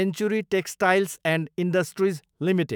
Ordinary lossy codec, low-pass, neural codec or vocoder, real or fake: none; none; autoencoder, 48 kHz, 128 numbers a frame, DAC-VAE, trained on Japanese speech; fake